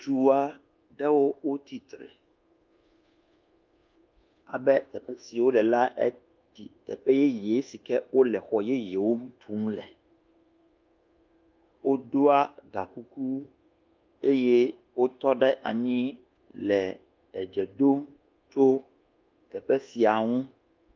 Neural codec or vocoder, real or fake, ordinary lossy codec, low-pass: codec, 24 kHz, 1.2 kbps, DualCodec; fake; Opus, 24 kbps; 7.2 kHz